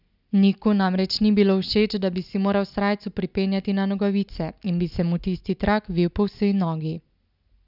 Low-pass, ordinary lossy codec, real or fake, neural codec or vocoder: 5.4 kHz; none; real; none